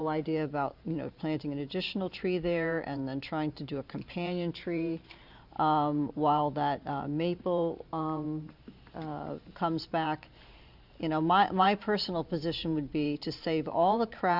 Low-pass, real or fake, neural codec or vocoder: 5.4 kHz; fake; vocoder, 44.1 kHz, 128 mel bands every 512 samples, BigVGAN v2